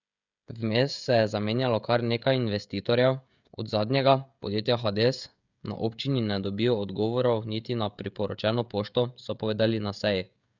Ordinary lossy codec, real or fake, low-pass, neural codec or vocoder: none; fake; 7.2 kHz; codec, 16 kHz, 16 kbps, FreqCodec, smaller model